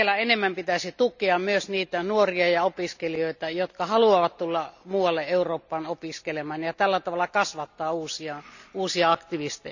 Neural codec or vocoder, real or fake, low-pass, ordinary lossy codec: none; real; 7.2 kHz; none